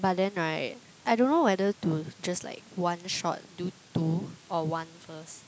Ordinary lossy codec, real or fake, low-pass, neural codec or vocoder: none; real; none; none